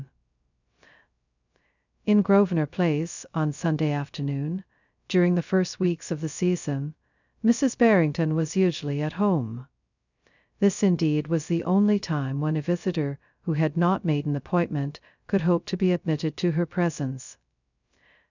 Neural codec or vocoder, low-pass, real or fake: codec, 16 kHz, 0.2 kbps, FocalCodec; 7.2 kHz; fake